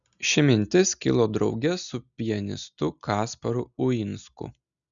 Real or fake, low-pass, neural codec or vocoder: real; 7.2 kHz; none